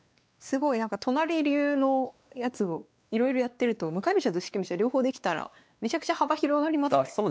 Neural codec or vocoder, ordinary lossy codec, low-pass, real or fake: codec, 16 kHz, 2 kbps, X-Codec, WavLM features, trained on Multilingual LibriSpeech; none; none; fake